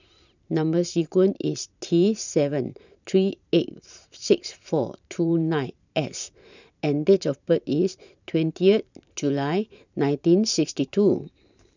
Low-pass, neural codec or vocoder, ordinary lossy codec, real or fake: 7.2 kHz; vocoder, 44.1 kHz, 128 mel bands every 512 samples, BigVGAN v2; none; fake